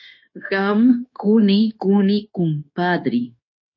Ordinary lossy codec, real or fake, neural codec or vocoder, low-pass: MP3, 32 kbps; fake; codec, 16 kHz, 0.9 kbps, LongCat-Audio-Codec; 7.2 kHz